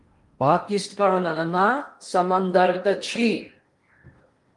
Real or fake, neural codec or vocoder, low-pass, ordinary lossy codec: fake; codec, 16 kHz in and 24 kHz out, 0.8 kbps, FocalCodec, streaming, 65536 codes; 10.8 kHz; Opus, 32 kbps